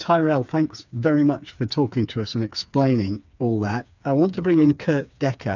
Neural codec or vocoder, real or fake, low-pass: codec, 16 kHz, 4 kbps, FreqCodec, smaller model; fake; 7.2 kHz